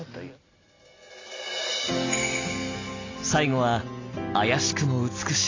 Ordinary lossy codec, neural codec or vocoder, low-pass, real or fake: AAC, 32 kbps; none; 7.2 kHz; real